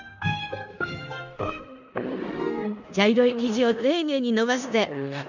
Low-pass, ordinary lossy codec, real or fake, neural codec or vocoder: 7.2 kHz; none; fake; codec, 16 kHz in and 24 kHz out, 0.9 kbps, LongCat-Audio-Codec, four codebook decoder